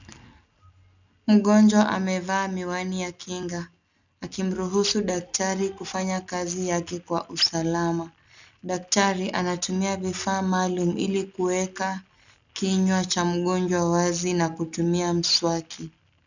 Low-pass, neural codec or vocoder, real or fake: 7.2 kHz; none; real